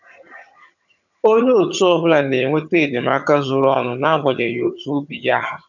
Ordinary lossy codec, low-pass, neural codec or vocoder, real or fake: none; 7.2 kHz; vocoder, 22.05 kHz, 80 mel bands, HiFi-GAN; fake